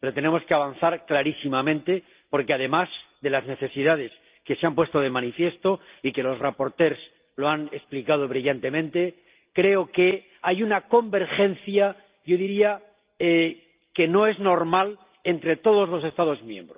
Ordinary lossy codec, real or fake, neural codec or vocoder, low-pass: Opus, 32 kbps; real; none; 3.6 kHz